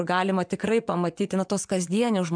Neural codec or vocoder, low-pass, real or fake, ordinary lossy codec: vocoder, 24 kHz, 100 mel bands, Vocos; 9.9 kHz; fake; MP3, 96 kbps